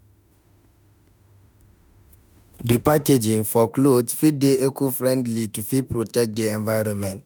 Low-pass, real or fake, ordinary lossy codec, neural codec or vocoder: none; fake; none; autoencoder, 48 kHz, 32 numbers a frame, DAC-VAE, trained on Japanese speech